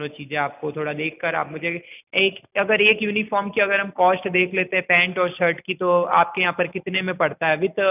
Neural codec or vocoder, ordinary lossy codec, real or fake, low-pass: none; none; real; 3.6 kHz